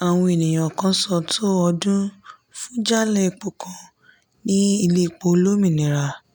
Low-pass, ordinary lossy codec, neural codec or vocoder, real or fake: 19.8 kHz; none; none; real